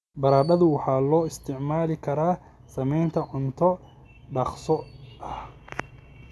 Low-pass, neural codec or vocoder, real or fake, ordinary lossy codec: none; none; real; none